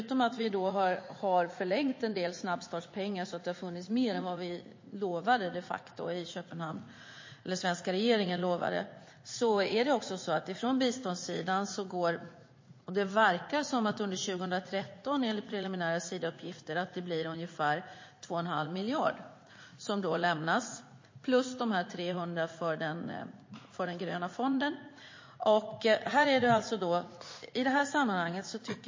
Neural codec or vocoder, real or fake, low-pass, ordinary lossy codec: vocoder, 44.1 kHz, 80 mel bands, Vocos; fake; 7.2 kHz; MP3, 32 kbps